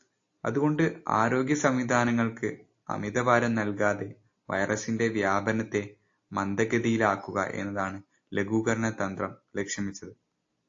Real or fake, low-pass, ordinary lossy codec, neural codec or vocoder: real; 7.2 kHz; AAC, 48 kbps; none